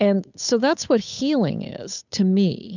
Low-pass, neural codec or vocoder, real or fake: 7.2 kHz; codec, 16 kHz, 8 kbps, FunCodec, trained on Chinese and English, 25 frames a second; fake